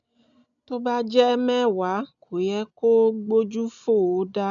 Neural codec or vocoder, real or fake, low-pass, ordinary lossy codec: none; real; 7.2 kHz; none